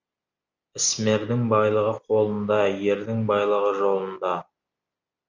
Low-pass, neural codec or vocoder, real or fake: 7.2 kHz; none; real